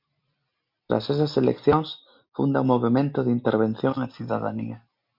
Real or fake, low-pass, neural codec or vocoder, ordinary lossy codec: real; 5.4 kHz; none; MP3, 48 kbps